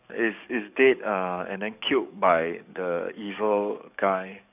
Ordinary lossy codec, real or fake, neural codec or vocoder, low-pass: none; fake; codec, 44.1 kHz, 7.8 kbps, DAC; 3.6 kHz